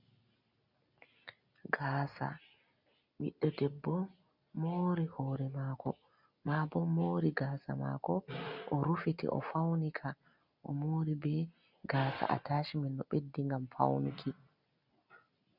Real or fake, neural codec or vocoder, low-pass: real; none; 5.4 kHz